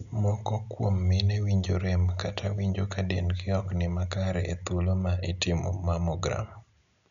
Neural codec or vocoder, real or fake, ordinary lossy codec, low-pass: none; real; none; 7.2 kHz